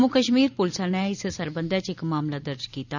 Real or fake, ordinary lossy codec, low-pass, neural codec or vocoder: fake; none; 7.2 kHz; vocoder, 44.1 kHz, 128 mel bands every 256 samples, BigVGAN v2